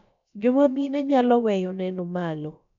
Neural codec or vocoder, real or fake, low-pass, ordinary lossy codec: codec, 16 kHz, about 1 kbps, DyCAST, with the encoder's durations; fake; 7.2 kHz; none